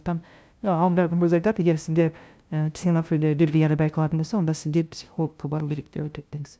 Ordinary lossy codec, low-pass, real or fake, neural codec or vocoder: none; none; fake; codec, 16 kHz, 0.5 kbps, FunCodec, trained on LibriTTS, 25 frames a second